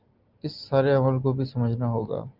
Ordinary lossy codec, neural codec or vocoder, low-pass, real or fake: Opus, 24 kbps; none; 5.4 kHz; real